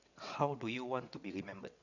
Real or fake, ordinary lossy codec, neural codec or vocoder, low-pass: fake; none; vocoder, 22.05 kHz, 80 mel bands, WaveNeXt; 7.2 kHz